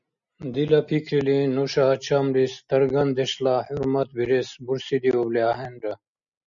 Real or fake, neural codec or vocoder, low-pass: real; none; 7.2 kHz